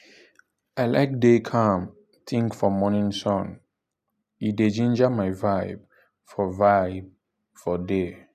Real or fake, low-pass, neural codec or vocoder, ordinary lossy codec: real; 14.4 kHz; none; none